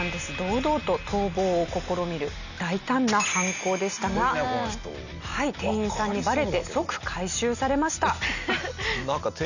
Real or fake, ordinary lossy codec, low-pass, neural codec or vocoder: real; none; 7.2 kHz; none